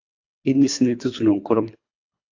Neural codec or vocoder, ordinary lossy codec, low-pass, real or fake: codec, 24 kHz, 3 kbps, HILCodec; AAC, 48 kbps; 7.2 kHz; fake